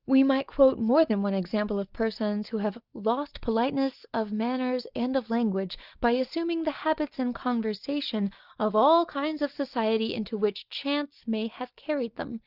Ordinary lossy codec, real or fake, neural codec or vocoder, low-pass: Opus, 24 kbps; real; none; 5.4 kHz